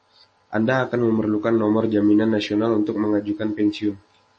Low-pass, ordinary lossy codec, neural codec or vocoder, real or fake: 10.8 kHz; MP3, 32 kbps; none; real